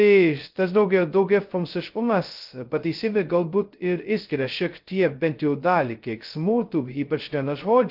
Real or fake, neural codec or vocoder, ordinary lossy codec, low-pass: fake; codec, 16 kHz, 0.2 kbps, FocalCodec; Opus, 24 kbps; 5.4 kHz